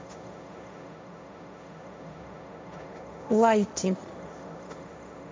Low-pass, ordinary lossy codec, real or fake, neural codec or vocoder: none; none; fake; codec, 16 kHz, 1.1 kbps, Voila-Tokenizer